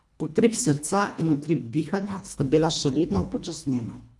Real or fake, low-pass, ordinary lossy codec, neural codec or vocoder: fake; none; none; codec, 24 kHz, 1.5 kbps, HILCodec